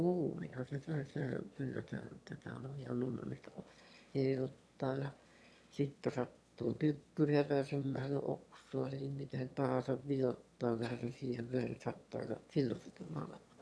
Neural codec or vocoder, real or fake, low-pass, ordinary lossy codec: autoencoder, 22.05 kHz, a latent of 192 numbers a frame, VITS, trained on one speaker; fake; none; none